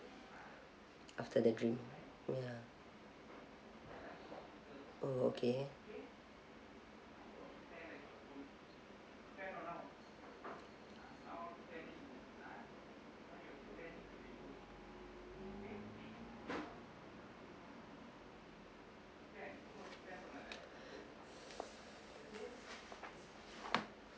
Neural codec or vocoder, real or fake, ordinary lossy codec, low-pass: none; real; none; none